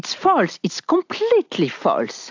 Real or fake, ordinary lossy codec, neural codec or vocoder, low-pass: real; AAC, 48 kbps; none; 7.2 kHz